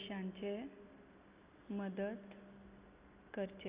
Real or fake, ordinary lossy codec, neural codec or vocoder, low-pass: real; Opus, 64 kbps; none; 3.6 kHz